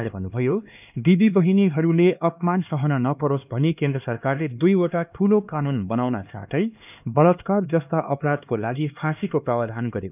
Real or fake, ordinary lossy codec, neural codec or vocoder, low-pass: fake; none; codec, 16 kHz, 2 kbps, X-Codec, HuBERT features, trained on LibriSpeech; 3.6 kHz